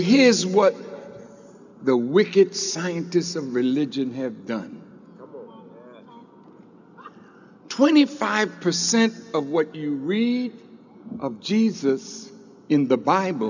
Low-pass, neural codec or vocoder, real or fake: 7.2 kHz; none; real